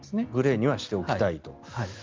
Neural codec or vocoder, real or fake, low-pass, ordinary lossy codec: none; real; 7.2 kHz; Opus, 24 kbps